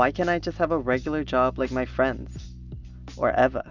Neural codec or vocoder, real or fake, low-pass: none; real; 7.2 kHz